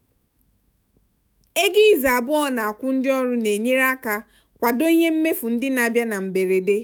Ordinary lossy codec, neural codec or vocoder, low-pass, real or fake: none; autoencoder, 48 kHz, 128 numbers a frame, DAC-VAE, trained on Japanese speech; none; fake